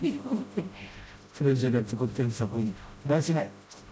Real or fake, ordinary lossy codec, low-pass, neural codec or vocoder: fake; none; none; codec, 16 kHz, 0.5 kbps, FreqCodec, smaller model